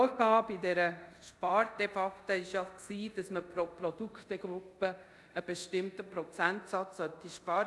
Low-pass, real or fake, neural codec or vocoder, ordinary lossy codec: none; fake; codec, 24 kHz, 0.5 kbps, DualCodec; none